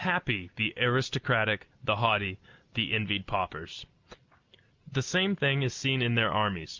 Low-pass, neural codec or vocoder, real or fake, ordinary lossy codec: 7.2 kHz; none; real; Opus, 24 kbps